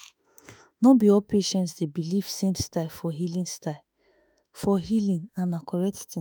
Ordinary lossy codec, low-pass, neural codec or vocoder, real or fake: none; none; autoencoder, 48 kHz, 32 numbers a frame, DAC-VAE, trained on Japanese speech; fake